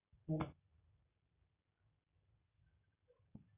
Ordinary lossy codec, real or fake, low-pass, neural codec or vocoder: none; real; 3.6 kHz; none